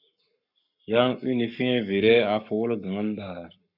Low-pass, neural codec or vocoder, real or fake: 5.4 kHz; codec, 44.1 kHz, 7.8 kbps, Pupu-Codec; fake